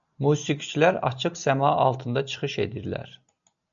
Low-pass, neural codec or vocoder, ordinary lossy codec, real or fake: 7.2 kHz; none; MP3, 96 kbps; real